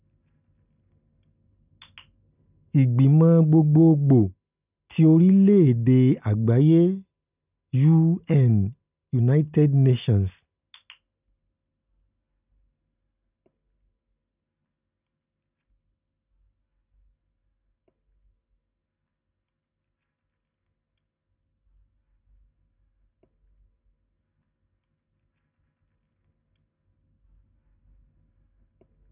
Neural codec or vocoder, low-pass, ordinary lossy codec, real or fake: none; 3.6 kHz; none; real